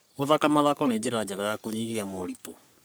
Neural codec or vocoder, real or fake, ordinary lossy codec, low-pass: codec, 44.1 kHz, 3.4 kbps, Pupu-Codec; fake; none; none